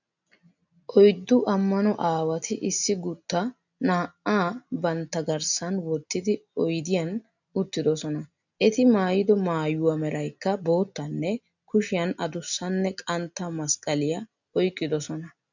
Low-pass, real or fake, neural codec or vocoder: 7.2 kHz; real; none